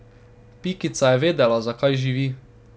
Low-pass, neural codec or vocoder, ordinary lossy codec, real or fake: none; none; none; real